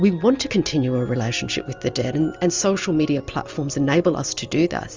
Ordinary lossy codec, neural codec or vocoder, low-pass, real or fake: Opus, 32 kbps; none; 7.2 kHz; real